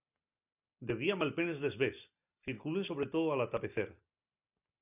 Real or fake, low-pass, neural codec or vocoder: real; 3.6 kHz; none